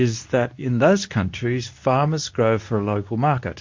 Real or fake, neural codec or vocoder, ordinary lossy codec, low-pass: fake; codec, 24 kHz, 0.9 kbps, WavTokenizer, medium speech release version 2; MP3, 48 kbps; 7.2 kHz